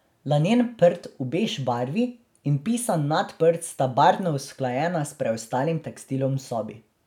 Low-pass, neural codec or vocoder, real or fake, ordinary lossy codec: 19.8 kHz; none; real; none